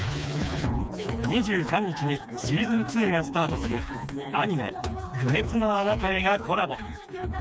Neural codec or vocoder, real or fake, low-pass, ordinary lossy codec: codec, 16 kHz, 2 kbps, FreqCodec, smaller model; fake; none; none